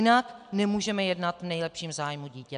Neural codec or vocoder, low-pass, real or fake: none; 9.9 kHz; real